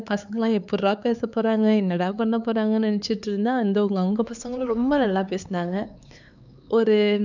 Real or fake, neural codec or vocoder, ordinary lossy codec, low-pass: fake; codec, 16 kHz, 4 kbps, X-Codec, HuBERT features, trained on LibriSpeech; none; 7.2 kHz